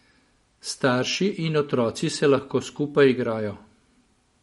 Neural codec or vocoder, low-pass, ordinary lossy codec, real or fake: none; 19.8 kHz; MP3, 48 kbps; real